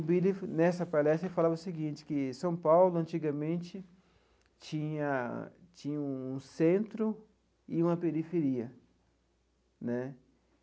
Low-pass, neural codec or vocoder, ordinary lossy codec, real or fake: none; none; none; real